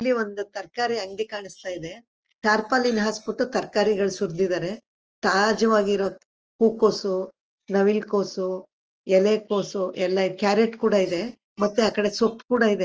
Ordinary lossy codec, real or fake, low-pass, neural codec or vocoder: Opus, 24 kbps; real; 7.2 kHz; none